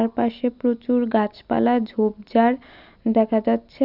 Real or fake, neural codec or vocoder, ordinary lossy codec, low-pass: real; none; none; 5.4 kHz